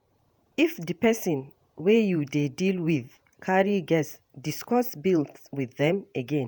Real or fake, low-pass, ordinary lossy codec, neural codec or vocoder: fake; none; none; vocoder, 48 kHz, 128 mel bands, Vocos